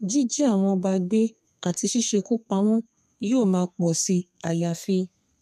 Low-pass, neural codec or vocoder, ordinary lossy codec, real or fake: 14.4 kHz; codec, 32 kHz, 1.9 kbps, SNAC; none; fake